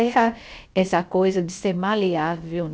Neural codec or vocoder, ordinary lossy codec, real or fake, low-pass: codec, 16 kHz, about 1 kbps, DyCAST, with the encoder's durations; none; fake; none